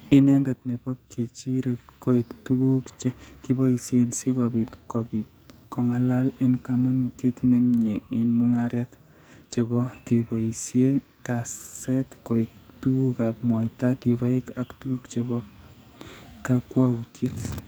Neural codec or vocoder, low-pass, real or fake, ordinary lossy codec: codec, 44.1 kHz, 2.6 kbps, SNAC; none; fake; none